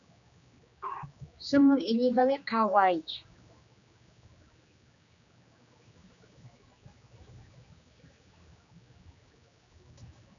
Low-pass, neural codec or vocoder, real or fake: 7.2 kHz; codec, 16 kHz, 2 kbps, X-Codec, HuBERT features, trained on general audio; fake